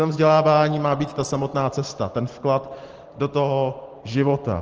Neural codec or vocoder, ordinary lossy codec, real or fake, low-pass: none; Opus, 16 kbps; real; 7.2 kHz